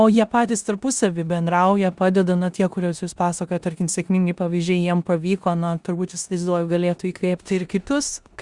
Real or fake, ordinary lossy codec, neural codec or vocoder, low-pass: fake; Opus, 64 kbps; codec, 16 kHz in and 24 kHz out, 0.9 kbps, LongCat-Audio-Codec, four codebook decoder; 10.8 kHz